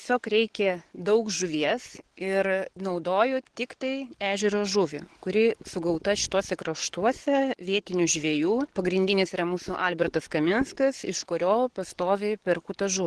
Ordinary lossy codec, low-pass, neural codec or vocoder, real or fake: Opus, 16 kbps; 10.8 kHz; codec, 44.1 kHz, 7.8 kbps, DAC; fake